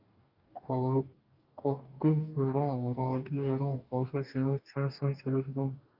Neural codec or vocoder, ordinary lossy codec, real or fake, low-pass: codec, 44.1 kHz, 2.6 kbps, DAC; Opus, 24 kbps; fake; 5.4 kHz